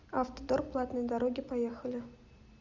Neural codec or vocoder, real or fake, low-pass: none; real; 7.2 kHz